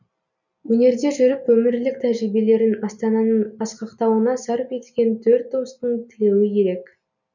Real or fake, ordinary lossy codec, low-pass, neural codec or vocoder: real; none; 7.2 kHz; none